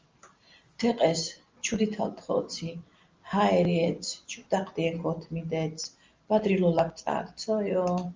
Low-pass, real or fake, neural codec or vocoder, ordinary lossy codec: 7.2 kHz; real; none; Opus, 32 kbps